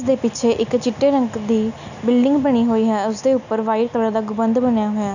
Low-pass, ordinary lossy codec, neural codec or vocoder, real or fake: 7.2 kHz; none; none; real